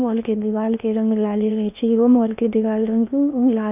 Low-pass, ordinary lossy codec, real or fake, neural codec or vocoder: 3.6 kHz; none; fake; codec, 16 kHz in and 24 kHz out, 0.8 kbps, FocalCodec, streaming, 65536 codes